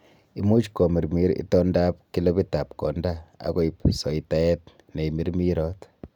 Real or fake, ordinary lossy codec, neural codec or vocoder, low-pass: real; none; none; 19.8 kHz